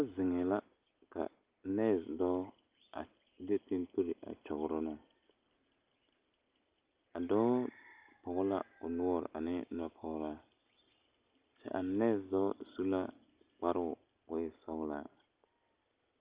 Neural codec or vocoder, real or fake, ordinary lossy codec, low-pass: none; real; Opus, 32 kbps; 3.6 kHz